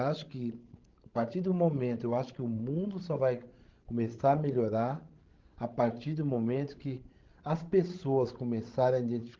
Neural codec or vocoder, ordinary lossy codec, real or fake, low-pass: codec, 16 kHz, 16 kbps, FreqCodec, larger model; Opus, 16 kbps; fake; 7.2 kHz